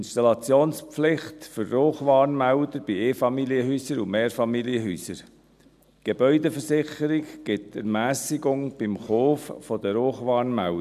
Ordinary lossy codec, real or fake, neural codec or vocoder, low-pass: none; real; none; 14.4 kHz